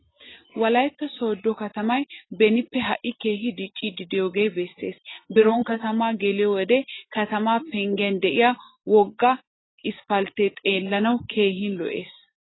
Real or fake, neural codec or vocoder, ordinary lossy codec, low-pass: real; none; AAC, 16 kbps; 7.2 kHz